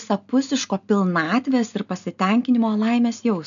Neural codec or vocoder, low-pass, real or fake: none; 7.2 kHz; real